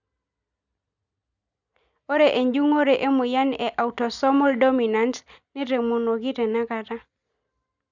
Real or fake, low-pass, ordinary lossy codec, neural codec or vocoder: real; 7.2 kHz; none; none